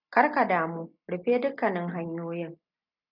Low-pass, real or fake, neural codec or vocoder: 5.4 kHz; real; none